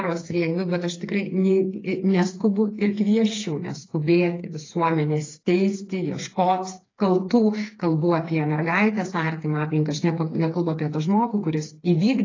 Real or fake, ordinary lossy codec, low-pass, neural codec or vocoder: fake; AAC, 32 kbps; 7.2 kHz; codec, 16 kHz, 4 kbps, FreqCodec, smaller model